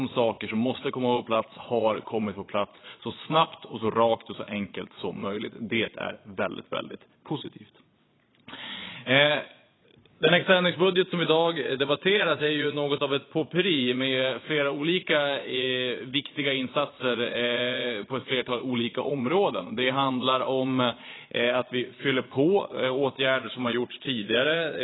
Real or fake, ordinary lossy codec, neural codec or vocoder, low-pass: fake; AAC, 16 kbps; vocoder, 22.05 kHz, 80 mel bands, Vocos; 7.2 kHz